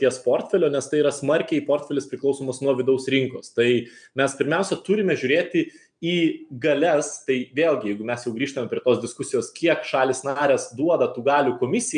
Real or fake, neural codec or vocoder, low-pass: real; none; 9.9 kHz